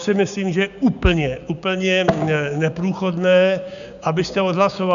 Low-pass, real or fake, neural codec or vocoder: 7.2 kHz; fake; codec, 16 kHz, 6 kbps, DAC